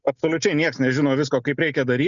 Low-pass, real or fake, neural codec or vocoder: 7.2 kHz; real; none